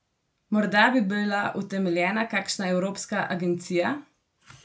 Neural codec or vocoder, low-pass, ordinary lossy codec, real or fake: none; none; none; real